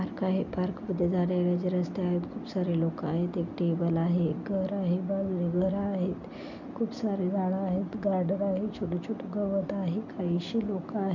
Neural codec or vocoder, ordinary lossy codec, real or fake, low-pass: none; none; real; 7.2 kHz